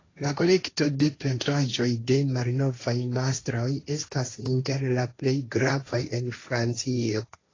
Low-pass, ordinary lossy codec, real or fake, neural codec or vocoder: 7.2 kHz; AAC, 32 kbps; fake; codec, 16 kHz, 1.1 kbps, Voila-Tokenizer